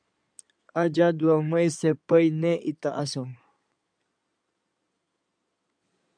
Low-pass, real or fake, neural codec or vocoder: 9.9 kHz; fake; codec, 16 kHz in and 24 kHz out, 2.2 kbps, FireRedTTS-2 codec